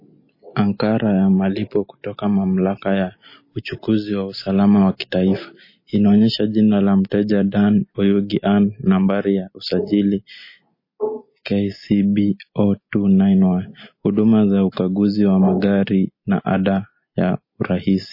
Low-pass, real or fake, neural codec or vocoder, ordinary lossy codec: 5.4 kHz; real; none; MP3, 24 kbps